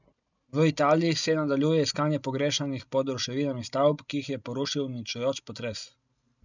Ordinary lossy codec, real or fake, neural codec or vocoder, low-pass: none; real; none; 7.2 kHz